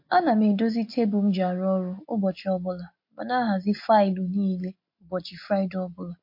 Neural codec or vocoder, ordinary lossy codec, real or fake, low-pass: none; MP3, 32 kbps; real; 5.4 kHz